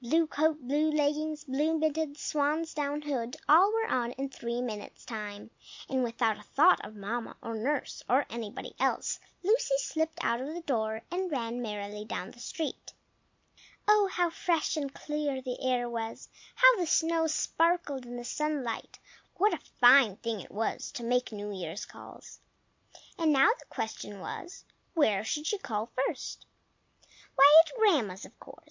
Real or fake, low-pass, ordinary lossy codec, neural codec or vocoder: real; 7.2 kHz; MP3, 48 kbps; none